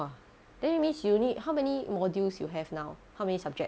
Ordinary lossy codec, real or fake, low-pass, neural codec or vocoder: none; real; none; none